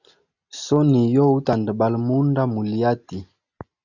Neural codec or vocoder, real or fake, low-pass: none; real; 7.2 kHz